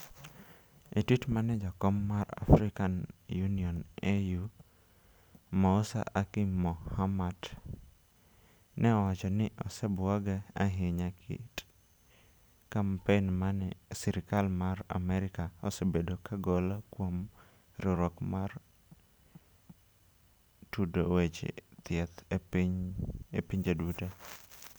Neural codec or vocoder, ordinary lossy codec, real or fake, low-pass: none; none; real; none